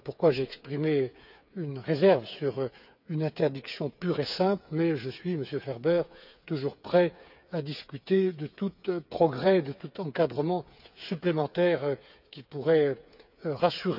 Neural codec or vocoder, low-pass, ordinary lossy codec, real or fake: codec, 16 kHz, 8 kbps, FreqCodec, smaller model; 5.4 kHz; none; fake